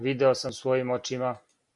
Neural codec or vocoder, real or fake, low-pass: none; real; 10.8 kHz